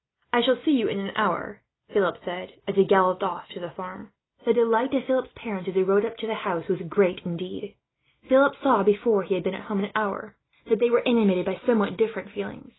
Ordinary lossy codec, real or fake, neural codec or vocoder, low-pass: AAC, 16 kbps; real; none; 7.2 kHz